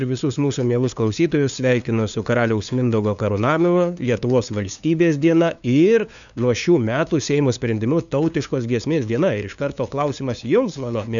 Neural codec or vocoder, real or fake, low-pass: codec, 16 kHz, 2 kbps, FunCodec, trained on LibriTTS, 25 frames a second; fake; 7.2 kHz